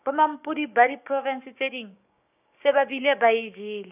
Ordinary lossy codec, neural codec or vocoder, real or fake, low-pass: none; codec, 16 kHz, 6 kbps, DAC; fake; 3.6 kHz